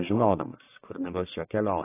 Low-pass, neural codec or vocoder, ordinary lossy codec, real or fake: 3.6 kHz; codec, 44.1 kHz, 1.7 kbps, Pupu-Codec; AAC, 16 kbps; fake